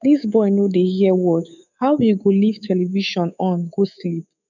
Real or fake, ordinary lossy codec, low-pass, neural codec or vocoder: fake; none; 7.2 kHz; codec, 24 kHz, 3.1 kbps, DualCodec